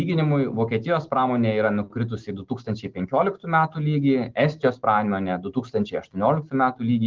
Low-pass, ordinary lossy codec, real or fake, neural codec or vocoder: 7.2 kHz; Opus, 16 kbps; real; none